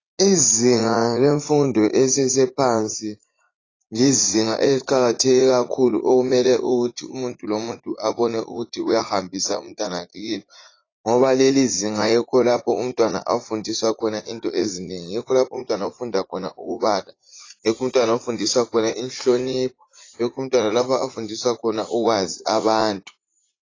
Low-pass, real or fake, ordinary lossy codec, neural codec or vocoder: 7.2 kHz; fake; AAC, 32 kbps; vocoder, 44.1 kHz, 80 mel bands, Vocos